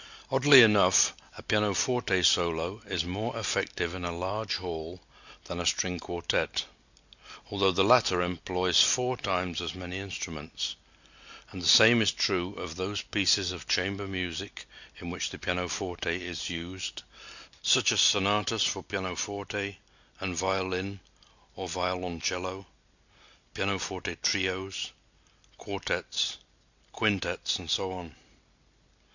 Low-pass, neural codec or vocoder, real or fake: 7.2 kHz; none; real